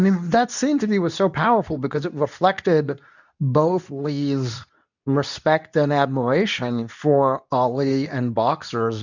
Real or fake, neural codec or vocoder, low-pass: fake; codec, 24 kHz, 0.9 kbps, WavTokenizer, medium speech release version 2; 7.2 kHz